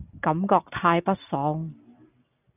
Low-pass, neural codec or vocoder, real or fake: 3.6 kHz; none; real